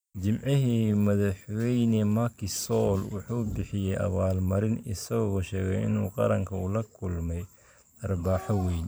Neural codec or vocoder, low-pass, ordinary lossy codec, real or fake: vocoder, 44.1 kHz, 128 mel bands every 512 samples, BigVGAN v2; none; none; fake